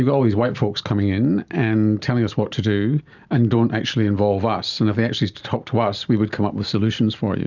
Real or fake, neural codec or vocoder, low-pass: real; none; 7.2 kHz